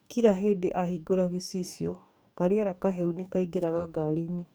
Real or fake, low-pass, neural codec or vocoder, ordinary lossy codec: fake; none; codec, 44.1 kHz, 2.6 kbps, DAC; none